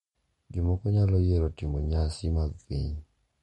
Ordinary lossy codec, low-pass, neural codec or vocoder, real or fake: MP3, 48 kbps; 14.4 kHz; none; real